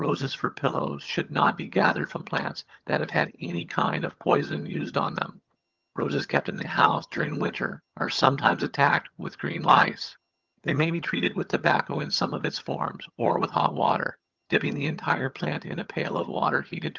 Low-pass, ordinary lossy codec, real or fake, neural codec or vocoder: 7.2 kHz; Opus, 24 kbps; fake; vocoder, 22.05 kHz, 80 mel bands, HiFi-GAN